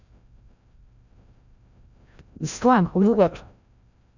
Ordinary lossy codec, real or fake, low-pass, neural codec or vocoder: none; fake; 7.2 kHz; codec, 16 kHz, 0.5 kbps, FreqCodec, larger model